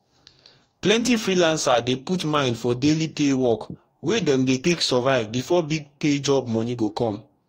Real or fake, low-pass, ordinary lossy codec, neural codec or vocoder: fake; 19.8 kHz; AAC, 48 kbps; codec, 44.1 kHz, 2.6 kbps, DAC